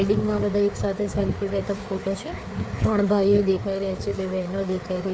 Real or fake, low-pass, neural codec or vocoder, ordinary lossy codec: fake; none; codec, 16 kHz, 4 kbps, FreqCodec, larger model; none